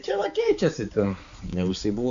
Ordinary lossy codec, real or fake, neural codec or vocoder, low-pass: AAC, 64 kbps; fake; codec, 16 kHz, 4 kbps, X-Codec, HuBERT features, trained on balanced general audio; 7.2 kHz